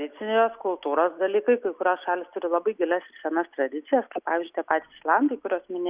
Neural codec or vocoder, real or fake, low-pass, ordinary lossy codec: none; real; 3.6 kHz; Opus, 64 kbps